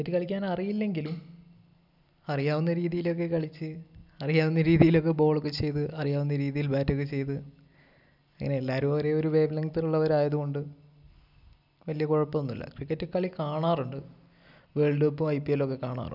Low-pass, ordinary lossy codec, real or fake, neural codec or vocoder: 5.4 kHz; none; real; none